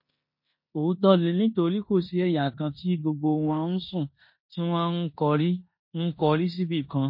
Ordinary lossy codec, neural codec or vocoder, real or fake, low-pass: MP3, 32 kbps; codec, 16 kHz in and 24 kHz out, 0.9 kbps, LongCat-Audio-Codec, four codebook decoder; fake; 5.4 kHz